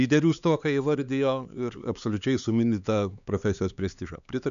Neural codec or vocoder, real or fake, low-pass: codec, 16 kHz, 4 kbps, X-Codec, HuBERT features, trained on LibriSpeech; fake; 7.2 kHz